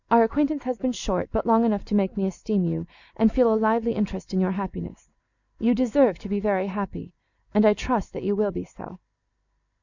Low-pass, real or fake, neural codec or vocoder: 7.2 kHz; real; none